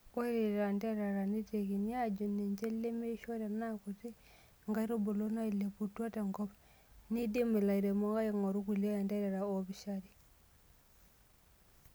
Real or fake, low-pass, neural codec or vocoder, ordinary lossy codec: real; none; none; none